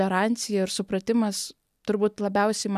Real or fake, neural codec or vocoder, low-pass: real; none; 14.4 kHz